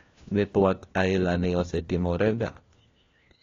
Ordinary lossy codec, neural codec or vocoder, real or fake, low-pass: AAC, 32 kbps; codec, 16 kHz, 1 kbps, FunCodec, trained on LibriTTS, 50 frames a second; fake; 7.2 kHz